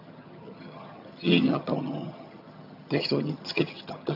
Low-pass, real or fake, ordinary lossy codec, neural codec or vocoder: 5.4 kHz; fake; none; vocoder, 22.05 kHz, 80 mel bands, HiFi-GAN